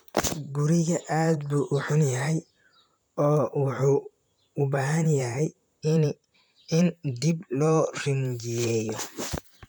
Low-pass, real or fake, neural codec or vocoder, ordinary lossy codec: none; fake; vocoder, 44.1 kHz, 128 mel bands, Pupu-Vocoder; none